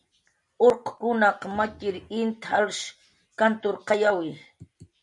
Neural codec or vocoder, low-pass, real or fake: none; 10.8 kHz; real